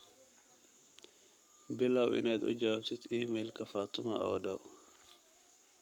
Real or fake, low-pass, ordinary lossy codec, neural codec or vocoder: fake; 19.8 kHz; none; codec, 44.1 kHz, 7.8 kbps, Pupu-Codec